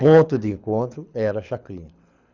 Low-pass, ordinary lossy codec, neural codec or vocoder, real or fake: 7.2 kHz; none; codec, 24 kHz, 6 kbps, HILCodec; fake